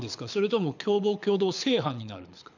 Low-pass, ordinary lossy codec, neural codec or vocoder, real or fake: 7.2 kHz; none; codec, 24 kHz, 6 kbps, HILCodec; fake